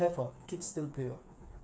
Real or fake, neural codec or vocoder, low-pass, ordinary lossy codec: fake; codec, 16 kHz, 4 kbps, FreqCodec, smaller model; none; none